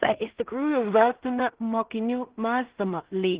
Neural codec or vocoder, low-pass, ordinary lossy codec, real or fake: codec, 16 kHz in and 24 kHz out, 0.4 kbps, LongCat-Audio-Codec, two codebook decoder; 3.6 kHz; Opus, 16 kbps; fake